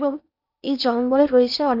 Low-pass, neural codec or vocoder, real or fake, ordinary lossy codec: 5.4 kHz; codec, 16 kHz in and 24 kHz out, 0.8 kbps, FocalCodec, streaming, 65536 codes; fake; none